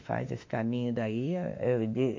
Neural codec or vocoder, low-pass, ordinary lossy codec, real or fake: autoencoder, 48 kHz, 32 numbers a frame, DAC-VAE, trained on Japanese speech; 7.2 kHz; MP3, 48 kbps; fake